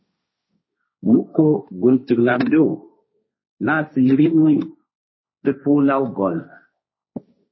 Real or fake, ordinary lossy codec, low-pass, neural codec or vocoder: fake; MP3, 24 kbps; 7.2 kHz; codec, 16 kHz, 1.1 kbps, Voila-Tokenizer